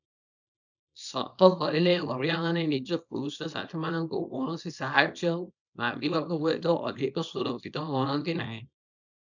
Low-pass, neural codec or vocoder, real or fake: 7.2 kHz; codec, 24 kHz, 0.9 kbps, WavTokenizer, small release; fake